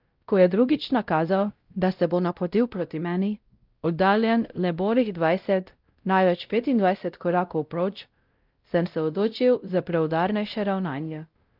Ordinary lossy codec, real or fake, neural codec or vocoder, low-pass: Opus, 32 kbps; fake; codec, 16 kHz, 0.5 kbps, X-Codec, WavLM features, trained on Multilingual LibriSpeech; 5.4 kHz